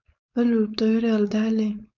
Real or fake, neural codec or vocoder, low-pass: fake; codec, 16 kHz, 4.8 kbps, FACodec; 7.2 kHz